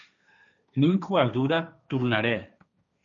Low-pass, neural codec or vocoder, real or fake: 7.2 kHz; codec, 16 kHz, 1.1 kbps, Voila-Tokenizer; fake